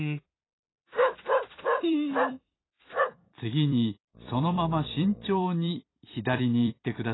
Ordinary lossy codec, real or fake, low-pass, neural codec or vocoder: AAC, 16 kbps; fake; 7.2 kHz; vocoder, 44.1 kHz, 128 mel bands every 256 samples, BigVGAN v2